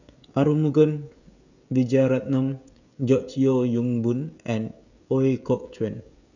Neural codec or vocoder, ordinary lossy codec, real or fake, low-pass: codec, 16 kHz, 16 kbps, FreqCodec, smaller model; none; fake; 7.2 kHz